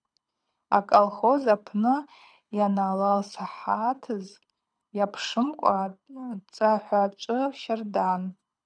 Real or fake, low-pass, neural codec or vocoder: fake; 9.9 kHz; codec, 24 kHz, 6 kbps, HILCodec